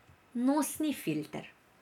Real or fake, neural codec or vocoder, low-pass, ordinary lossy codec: real; none; 19.8 kHz; none